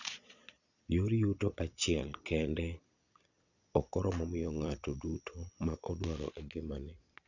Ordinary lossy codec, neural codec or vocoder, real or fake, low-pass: none; vocoder, 22.05 kHz, 80 mel bands, Vocos; fake; 7.2 kHz